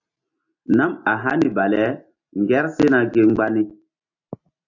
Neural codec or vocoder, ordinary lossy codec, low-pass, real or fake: none; AAC, 48 kbps; 7.2 kHz; real